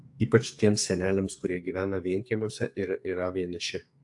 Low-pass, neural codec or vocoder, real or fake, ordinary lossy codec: 10.8 kHz; codec, 44.1 kHz, 2.6 kbps, SNAC; fake; AAC, 64 kbps